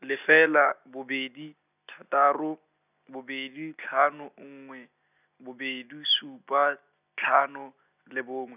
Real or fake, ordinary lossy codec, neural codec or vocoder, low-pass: real; none; none; 3.6 kHz